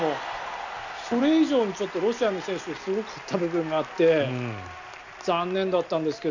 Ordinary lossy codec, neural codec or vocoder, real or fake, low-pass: none; none; real; 7.2 kHz